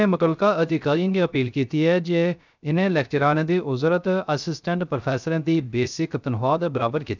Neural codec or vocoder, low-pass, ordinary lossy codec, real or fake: codec, 16 kHz, 0.3 kbps, FocalCodec; 7.2 kHz; none; fake